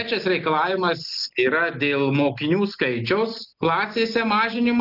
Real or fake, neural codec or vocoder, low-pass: real; none; 5.4 kHz